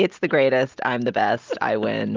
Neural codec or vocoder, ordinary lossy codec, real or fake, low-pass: none; Opus, 32 kbps; real; 7.2 kHz